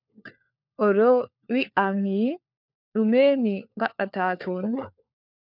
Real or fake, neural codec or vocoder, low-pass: fake; codec, 16 kHz, 4 kbps, FunCodec, trained on LibriTTS, 50 frames a second; 5.4 kHz